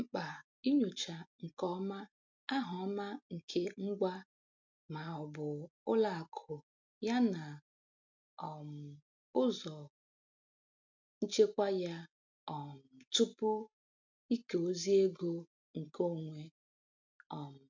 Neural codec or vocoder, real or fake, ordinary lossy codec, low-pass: none; real; MP3, 64 kbps; 7.2 kHz